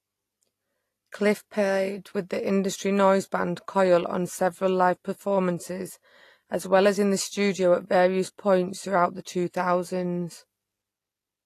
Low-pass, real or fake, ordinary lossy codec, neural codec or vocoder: 14.4 kHz; real; AAC, 48 kbps; none